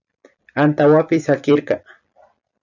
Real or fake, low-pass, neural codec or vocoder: fake; 7.2 kHz; vocoder, 44.1 kHz, 128 mel bands every 256 samples, BigVGAN v2